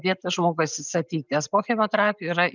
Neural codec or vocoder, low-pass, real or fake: none; 7.2 kHz; real